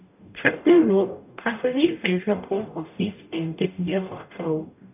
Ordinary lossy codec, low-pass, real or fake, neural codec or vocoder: none; 3.6 kHz; fake; codec, 44.1 kHz, 0.9 kbps, DAC